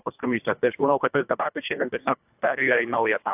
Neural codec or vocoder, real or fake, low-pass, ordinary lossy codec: codec, 24 kHz, 1.5 kbps, HILCodec; fake; 3.6 kHz; AAC, 32 kbps